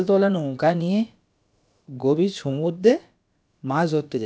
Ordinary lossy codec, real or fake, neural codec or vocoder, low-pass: none; fake; codec, 16 kHz, about 1 kbps, DyCAST, with the encoder's durations; none